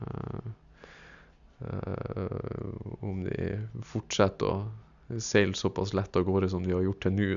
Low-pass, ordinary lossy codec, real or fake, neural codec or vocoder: 7.2 kHz; none; real; none